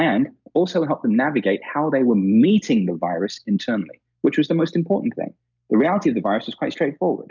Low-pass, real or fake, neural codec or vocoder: 7.2 kHz; real; none